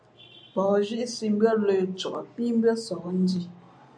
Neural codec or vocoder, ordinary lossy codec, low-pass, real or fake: none; AAC, 64 kbps; 9.9 kHz; real